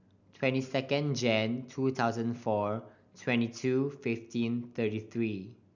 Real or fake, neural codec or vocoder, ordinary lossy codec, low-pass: real; none; none; 7.2 kHz